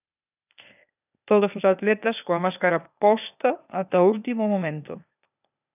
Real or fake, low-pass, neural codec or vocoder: fake; 3.6 kHz; codec, 16 kHz, 0.8 kbps, ZipCodec